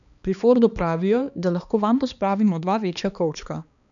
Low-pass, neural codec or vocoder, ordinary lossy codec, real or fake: 7.2 kHz; codec, 16 kHz, 2 kbps, X-Codec, HuBERT features, trained on balanced general audio; none; fake